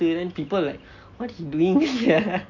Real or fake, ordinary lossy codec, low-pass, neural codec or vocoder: real; none; 7.2 kHz; none